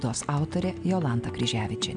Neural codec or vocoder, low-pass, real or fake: none; 9.9 kHz; real